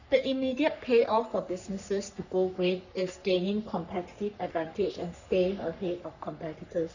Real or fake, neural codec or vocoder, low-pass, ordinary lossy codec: fake; codec, 44.1 kHz, 3.4 kbps, Pupu-Codec; 7.2 kHz; none